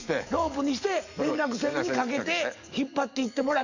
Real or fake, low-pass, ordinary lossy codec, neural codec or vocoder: fake; 7.2 kHz; none; codec, 44.1 kHz, 7.8 kbps, DAC